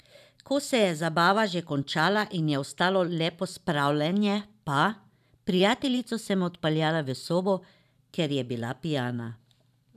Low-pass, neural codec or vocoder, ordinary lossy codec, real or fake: 14.4 kHz; vocoder, 44.1 kHz, 128 mel bands every 512 samples, BigVGAN v2; none; fake